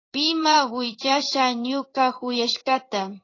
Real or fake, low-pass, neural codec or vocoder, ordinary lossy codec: fake; 7.2 kHz; codec, 16 kHz in and 24 kHz out, 1 kbps, XY-Tokenizer; AAC, 32 kbps